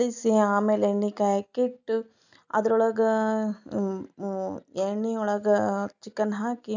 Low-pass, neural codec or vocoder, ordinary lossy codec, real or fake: 7.2 kHz; none; none; real